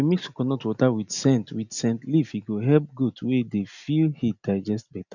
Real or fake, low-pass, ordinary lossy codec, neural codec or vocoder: real; 7.2 kHz; none; none